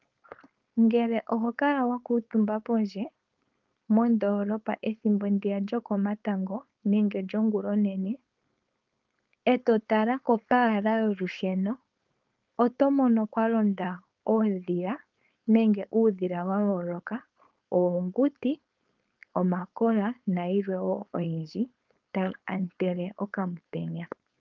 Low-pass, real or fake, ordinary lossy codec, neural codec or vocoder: 7.2 kHz; fake; Opus, 32 kbps; codec, 16 kHz, 4.8 kbps, FACodec